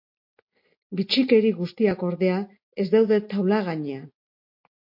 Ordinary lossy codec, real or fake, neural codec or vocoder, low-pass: MP3, 32 kbps; real; none; 5.4 kHz